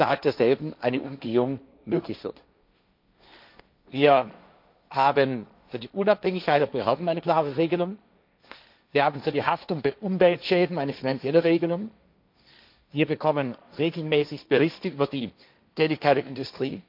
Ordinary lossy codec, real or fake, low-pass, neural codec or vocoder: MP3, 48 kbps; fake; 5.4 kHz; codec, 16 kHz, 1.1 kbps, Voila-Tokenizer